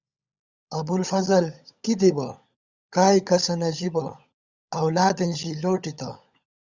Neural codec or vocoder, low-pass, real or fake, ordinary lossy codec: codec, 16 kHz, 16 kbps, FunCodec, trained on LibriTTS, 50 frames a second; 7.2 kHz; fake; Opus, 64 kbps